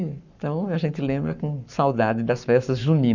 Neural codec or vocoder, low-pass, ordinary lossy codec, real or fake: codec, 44.1 kHz, 7.8 kbps, Pupu-Codec; 7.2 kHz; none; fake